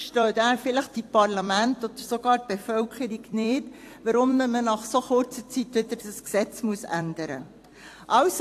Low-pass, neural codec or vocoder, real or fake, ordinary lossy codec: 14.4 kHz; vocoder, 44.1 kHz, 128 mel bands every 256 samples, BigVGAN v2; fake; AAC, 64 kbps